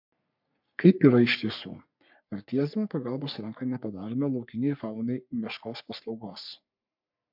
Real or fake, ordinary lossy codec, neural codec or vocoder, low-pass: fake; MP3, 48 kbps; codec, 44.1 kHz, 3.4 kbps, Pupu-Codec; 5.4 kHz